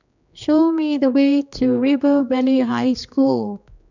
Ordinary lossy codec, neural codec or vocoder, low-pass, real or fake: none; codec, 16 kHz, 2 kbps, X-Codec, HuBERT features, trained on general audio; 7.2 kHz; fake